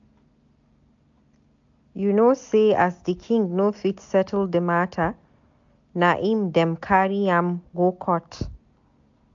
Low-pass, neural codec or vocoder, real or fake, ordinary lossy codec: 7.2 kHz; none; real; AAC, 64 kbps